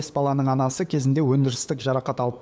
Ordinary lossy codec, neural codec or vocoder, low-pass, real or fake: none; codec, 16 kHz, 8 kbps, FunCodec, trained on LibriTTS, 25 frames a second; none; fake